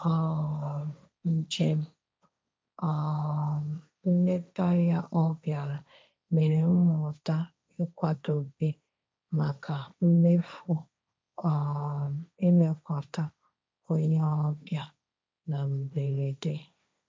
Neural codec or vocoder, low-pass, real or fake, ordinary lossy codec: codec, 16 kHz, 1.1 kbps, Voila-Tokenizer; none; fake; none